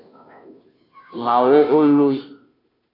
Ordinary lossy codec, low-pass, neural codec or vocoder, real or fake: AAC, 48 kbps; 5.4 kHz; codec, 16 kHz, 0.5 kbps, FunCodec, trained on Chinese and English, 25 frames a second; fake